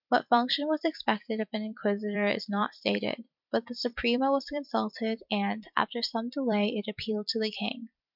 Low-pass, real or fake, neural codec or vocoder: 5.4 kHz; fake; vocoder, 44.1 kHz, 128 mel bands every 256 samples, BigVGAN v2